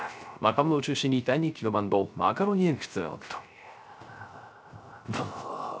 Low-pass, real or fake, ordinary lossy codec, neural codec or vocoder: none; fake; none; codec, 16 kHz, 0.3 kbps, FocalCodec